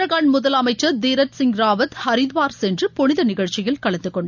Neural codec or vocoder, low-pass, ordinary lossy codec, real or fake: none; 7.2 kHz; none; real